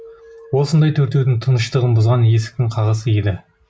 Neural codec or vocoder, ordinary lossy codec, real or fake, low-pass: none; none; real; none